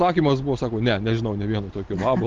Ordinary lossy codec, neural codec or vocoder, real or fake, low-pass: Opus, 24 kbps; none; real; 7.2 kHz